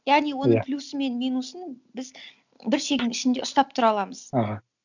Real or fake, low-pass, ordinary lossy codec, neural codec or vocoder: real; 7.2 kHz; none; none